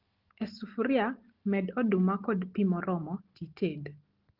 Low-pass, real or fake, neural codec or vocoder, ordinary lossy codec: 5.4 kHz; real; none; Opus, 16 kbps